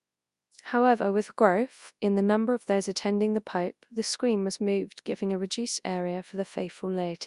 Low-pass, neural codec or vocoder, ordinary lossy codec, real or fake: 10.8 kHz; codec, 24 kHz, 0.9 kbps, WavTokenizer, large speech release; Opus, 64 kbps; fake